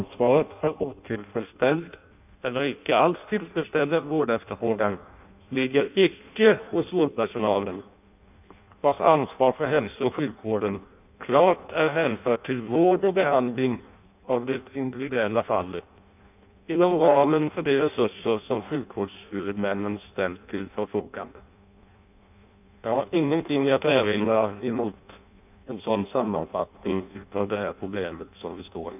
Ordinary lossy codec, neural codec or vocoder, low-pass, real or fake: none; codec, 16 kHz in and 24 kHz out, 0.6 kbps, FireRedTTS-2 codec; 3.6 kHz; fake